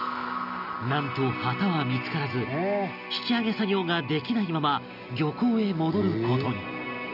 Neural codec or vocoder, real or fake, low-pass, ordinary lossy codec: none; real; 5.4 kHz; none